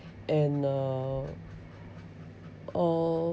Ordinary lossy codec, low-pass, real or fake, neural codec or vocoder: none; none; real; none